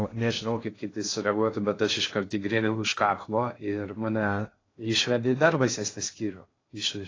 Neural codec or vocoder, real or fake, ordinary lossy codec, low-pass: codec, 16 kHz in and 24 kHz out, 0.6 kbps, FocalCodec, streaming, 2048 codes; fake; AAC, 32 kbps; 7.2 kHz